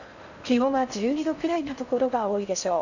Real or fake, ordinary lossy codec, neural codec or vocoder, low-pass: fake; none; codec, 16 kHz in and 24 kHz out, 0.6 kbps, FocalCodec, streaming, 4096 codes; 7.2 kHz